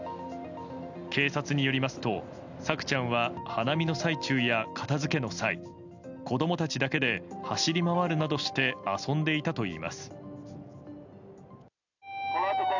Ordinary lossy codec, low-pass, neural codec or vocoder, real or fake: none; 7.2 kHz; none; real